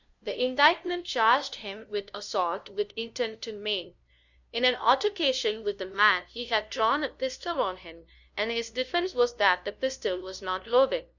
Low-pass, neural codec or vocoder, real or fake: 7.2 kHz; codec, 16 kHz, 0.5 kbps, FunCodec, trained on LibriTTS, 25 frames a second; fake